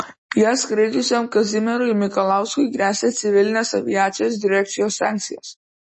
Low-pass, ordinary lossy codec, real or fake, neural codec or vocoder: 10.8 kHz; MP3, 32 kbps; real; none